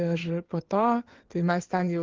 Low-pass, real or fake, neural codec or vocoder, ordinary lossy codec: 7.2 kHz; fake; codec, 16 kHz in and 24 kHz out, 2.2 kbps, FireRedTTS-2 codec; Opus, 16 kbps